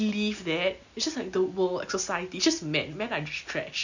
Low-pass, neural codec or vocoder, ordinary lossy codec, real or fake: 7.2 kHz; none; none; real